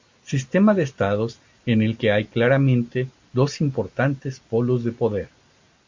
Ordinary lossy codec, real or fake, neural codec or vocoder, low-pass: MP3, 48 kbps; real; none; 7.2 kHz